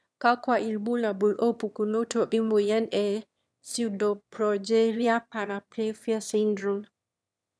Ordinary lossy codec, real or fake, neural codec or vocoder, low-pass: none; fake; autoencoder, 22.05 kHz, a latent of 192 numbers a frame, VITS, trained on one speaker; none